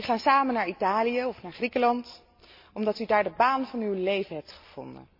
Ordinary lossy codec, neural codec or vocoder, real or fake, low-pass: MP3, 32 kbps; none; real; 5.4 kHz